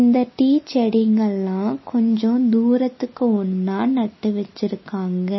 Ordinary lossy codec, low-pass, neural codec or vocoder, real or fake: MP3, 24 kbps; 7.2 kHz; none; real